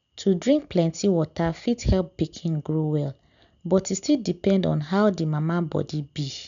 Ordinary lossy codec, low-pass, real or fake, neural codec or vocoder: none; 7.2 kHz; real; none